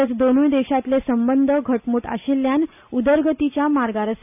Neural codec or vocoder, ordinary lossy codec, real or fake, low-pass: none; none; real; 3.6 kHz